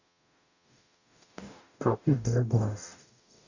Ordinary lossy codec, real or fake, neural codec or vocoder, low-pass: none; fake; codec, 44.1 kHz, 0.9 kbps, DAC; 7.2 kHz